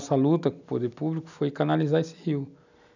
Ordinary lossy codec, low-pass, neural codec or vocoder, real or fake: none; 7.2 kHz; none; real